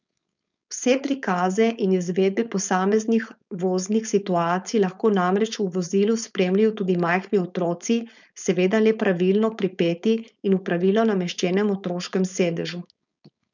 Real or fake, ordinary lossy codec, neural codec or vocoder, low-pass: fake; none; codec, 16 kHz, 4.8 kbps, FACodec; 7.2 kHz